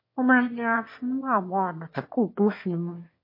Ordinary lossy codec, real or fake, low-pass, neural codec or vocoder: MP3, 32 kbps; fake; 5.4 kHz; autoencoder, 22.05 kHz, a latent of 192 numbers a frame, VITS, trained on one speaker